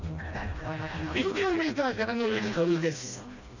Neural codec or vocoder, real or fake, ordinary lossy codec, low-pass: codec, 16 kHz, 1 kbps, FreqCodec, smaller model; fake; none; 7.2 kHz